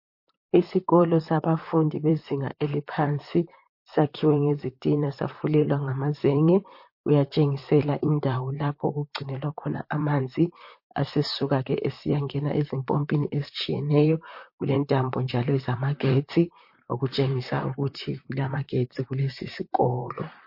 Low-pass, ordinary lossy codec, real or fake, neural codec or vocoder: 5.4 kHz; MP3, 32 kbps; fake; vocoder, 44.1 kHz, 128 mel bands, Pupu-Vocoder